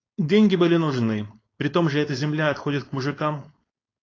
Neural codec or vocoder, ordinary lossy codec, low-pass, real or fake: codec, 16 kHz, 4.8 kbps, FACodec; AAC, 32 kbps; 7.2 kHz; fake